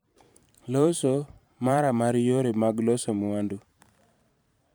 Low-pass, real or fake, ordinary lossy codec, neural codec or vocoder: none; fake; none; vocoder, 44.1 kHz, 128 mel bands every 512 samples, BigVGAN v2